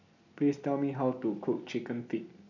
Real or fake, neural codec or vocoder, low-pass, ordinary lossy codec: real; none; 7.2 kHz; none